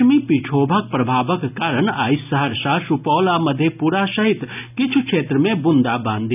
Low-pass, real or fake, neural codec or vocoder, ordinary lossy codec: 3.6 kHz; real; none; none